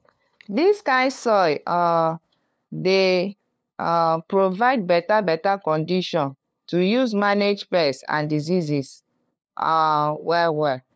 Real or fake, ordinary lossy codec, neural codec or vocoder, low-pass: fake; none; codec, 16 kHz, 2 kbps, FunCodec, trained on LibriTTS, 25 frames a second; none